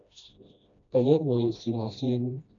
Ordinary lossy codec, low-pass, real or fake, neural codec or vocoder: AAC, 64 kbps; 7.2 kHz; fake; codec, 16 kHz, 1 kbps, FreqCodec, smaller model